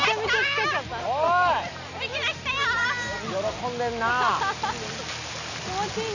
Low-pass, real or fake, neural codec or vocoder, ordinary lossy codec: 7.2 kHz; real; none; none